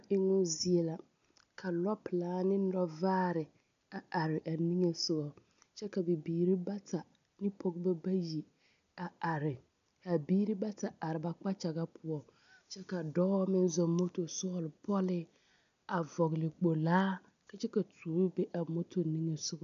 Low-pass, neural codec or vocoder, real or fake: 7.2 kHz; none; real